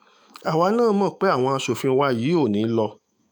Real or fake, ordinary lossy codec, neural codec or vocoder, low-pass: fake; none; autoencoder, 48 kHz, 128 numbers a frame, DAC-VAE, trained on Japanese speech; none